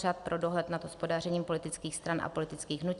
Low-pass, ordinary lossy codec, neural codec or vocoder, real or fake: 10.8 kHz; Opus, 64 kbps; none; real